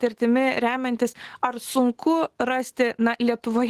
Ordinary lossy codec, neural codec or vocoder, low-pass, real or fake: Opus, 16 kbps; autoencoder, 48 kHz, 128 numbers a frame, DAC-VAE, trained on Japanese speech; 14.4 kHz; fake